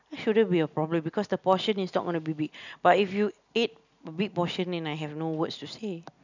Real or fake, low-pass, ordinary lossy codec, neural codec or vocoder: real; 7.2 kHz; none; none